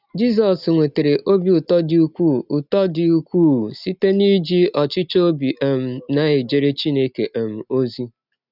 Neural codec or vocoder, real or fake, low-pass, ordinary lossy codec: none; real; 5.4 kHz; none